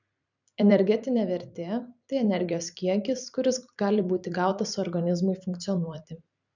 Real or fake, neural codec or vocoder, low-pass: fake; vocoder, 44.1 kHz, 128 mel bands every 256 samples, BigVGAN v2; 7.2 kHz